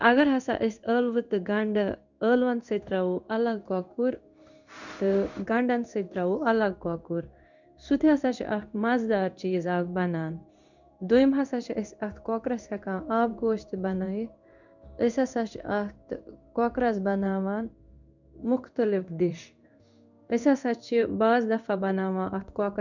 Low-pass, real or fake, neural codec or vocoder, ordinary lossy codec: 7.2 kHz; fake; codec, 16 kHz in and 24 kHz out, 1 kbps, XY-Tokenizer; none